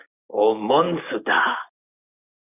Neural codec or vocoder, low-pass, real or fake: none; 3.6 kHz; real